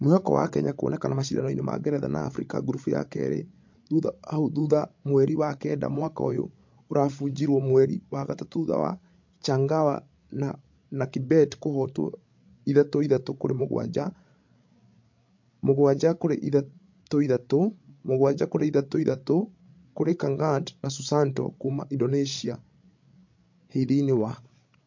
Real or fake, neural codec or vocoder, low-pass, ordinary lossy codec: fake; codec, 16 kHz, 16 kbps, FreqCodec, larger model; 7.2 kHz; MP3, 48 kbps